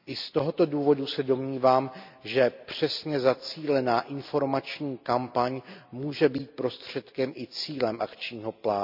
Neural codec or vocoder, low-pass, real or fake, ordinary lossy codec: none; 5.4 kHz; real; MP3, 48 kbps